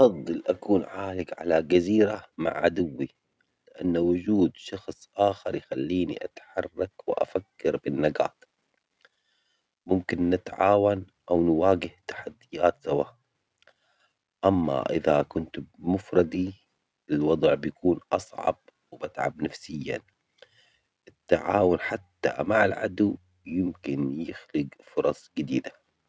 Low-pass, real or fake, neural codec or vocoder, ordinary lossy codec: none; real; none; none